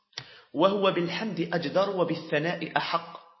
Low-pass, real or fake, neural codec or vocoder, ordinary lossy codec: 7.2 kHz; real; none; MP3, 24 kbps